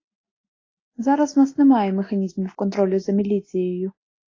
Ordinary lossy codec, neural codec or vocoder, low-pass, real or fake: AAC, 32 kbps; none; 7.2 kHz; real